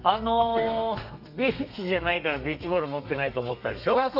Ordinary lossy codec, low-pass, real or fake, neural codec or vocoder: none; 5.4 kHz; fake; codec, 32 kHz, 1.9 kbps, SNAC